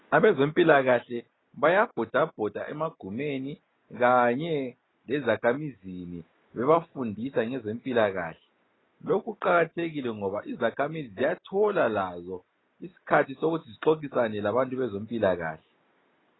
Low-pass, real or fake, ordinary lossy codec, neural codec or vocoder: 7.2 kHz; real; AAC, 16 kbps; none